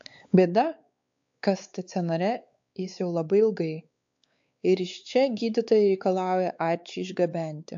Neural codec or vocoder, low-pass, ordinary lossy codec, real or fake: codec, 16 kHz, 4 kbps, X-Codec, WavLM features, trained on Multilingual LibriSpeech; 7.2 kHz; AAC, 64 kbps; fake